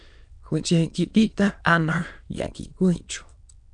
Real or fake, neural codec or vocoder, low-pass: fake; autoencoder, 22.05 kHz, a latent of 192 numbers a frame, VITS, trained on many speakers; 9.9 kHz